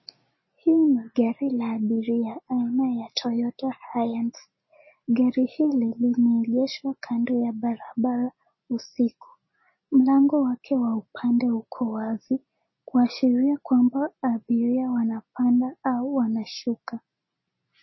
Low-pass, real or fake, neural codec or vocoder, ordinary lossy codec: 7.2 kHz; real; none; MP3, 24 kbps